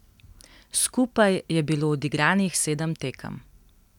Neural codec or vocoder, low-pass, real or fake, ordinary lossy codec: none; 19.8 kHz; real; none